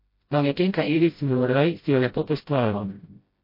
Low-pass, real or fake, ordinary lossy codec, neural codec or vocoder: 5.4 kHz; fake; MP3, 32 kbps; codec, 16 kHz, 0.5 kbps, FreqCodec, smaller model